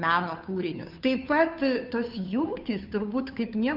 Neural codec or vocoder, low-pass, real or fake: codec, 16 kHz, 2 kbps, FunCodec, trained on Chinese and English, 25 frames a second; 5.4 kHz; fake